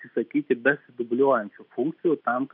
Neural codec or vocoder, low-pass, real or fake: none; 5.4 kHz; real